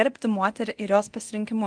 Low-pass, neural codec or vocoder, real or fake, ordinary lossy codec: 9.9 kHz; codec, 24 kHz, 0.9 kbps, DualCodec; fake; Opus, 24 kbps